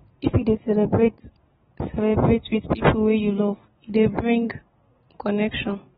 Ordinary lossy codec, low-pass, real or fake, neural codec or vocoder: AAC, 16 kbps; 10.8 kHz; real; none